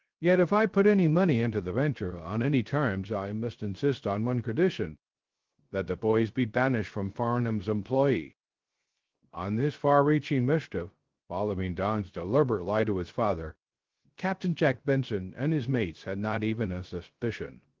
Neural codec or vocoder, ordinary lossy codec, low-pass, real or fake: codec, 16 kHz, 0.3 kbps, FocalCodec; Opus, 16 kbps; 7.2 kHz; fake